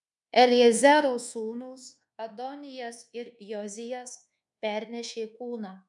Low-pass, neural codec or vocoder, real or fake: 10.8 kHz; codec, 24 kHz, 1.2 kbps, DualCodec; fake